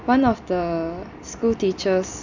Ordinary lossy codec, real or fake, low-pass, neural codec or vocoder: none; real; 7.2 kHz; none